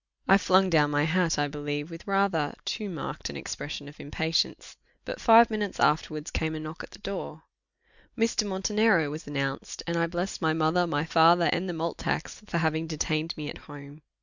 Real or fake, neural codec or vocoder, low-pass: real; none; 7.2 kHz